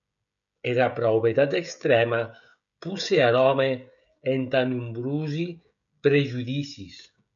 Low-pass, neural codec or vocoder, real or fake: 7.2 kHz; codec, 16 kHz, 16 kbps, FreqCodec, smaller model; fake